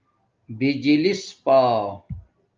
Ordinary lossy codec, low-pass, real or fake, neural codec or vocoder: Opus, 24 kbps; 7.2 kHz; real; none